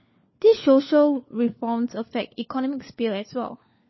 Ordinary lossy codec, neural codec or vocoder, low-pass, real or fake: MP3, 24 kbps; codec, 16 kHz, 4 kbps, FunCodec, trained on LibriTTS, 50 frames a second; 7.2 kHz; fake